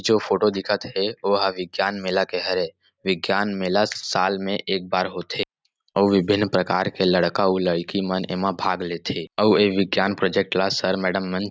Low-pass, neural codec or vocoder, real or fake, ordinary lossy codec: none; none; real; none